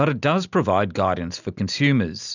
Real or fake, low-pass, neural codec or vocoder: real; 7.2 kHz; none